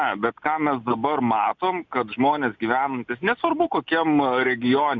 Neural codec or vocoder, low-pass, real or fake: none; 7.2 kHz; real